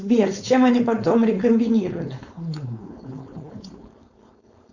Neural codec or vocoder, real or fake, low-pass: codec, 16 kHz, 4.8 kbps, FACodec; fake; 7.2 kHz